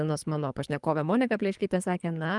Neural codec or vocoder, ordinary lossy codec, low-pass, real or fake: codec, 24 kHz, 3 kbps, HILCodec; Opus, 32 kbps; 10.8 kHz; fake